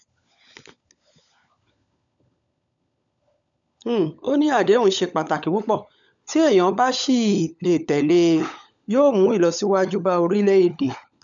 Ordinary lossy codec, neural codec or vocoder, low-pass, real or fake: none; codec, 16 kHz, 16 kbps, FunCodec, trained on LibriTTS, 50 frames a second; 7.2 kHz; fake